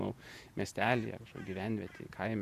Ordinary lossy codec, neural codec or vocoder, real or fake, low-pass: Opus, 64 kbps; vocoder, 48 kHz, 128 mel bands, Vocos; fake; 14.4 kHz